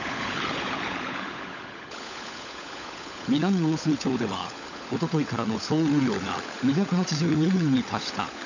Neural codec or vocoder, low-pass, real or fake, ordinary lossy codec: codec, 16 kHz, 16 kbps, FunCodec, trained on LibriTTS, 50 frames a second; 7.2 kHz; fake; none